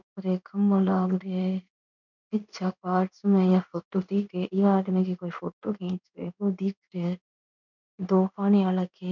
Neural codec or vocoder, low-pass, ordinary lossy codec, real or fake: codec, 16 kHz in and 24 kHz out, 1 kbps, XY-Tokenizer; 7.2 kHz; none; fake